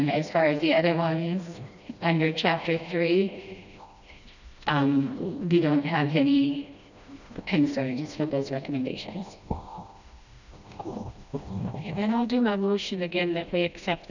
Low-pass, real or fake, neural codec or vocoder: 7.2 kHz; fake; codec, 16 kHz, 1 kbps, FreqCodec, smaller model